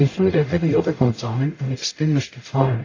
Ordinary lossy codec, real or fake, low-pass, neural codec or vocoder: AAC, 32 kbps; fake; 7.2 kHz; codec, 44.1 kHz, 0.9 kbps, DAC